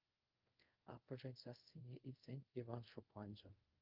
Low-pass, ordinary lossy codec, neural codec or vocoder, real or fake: 5.4 kHz; Opus, 24 kbps; codec, 24 kHz, 0.5 kbps, DualCodec; fake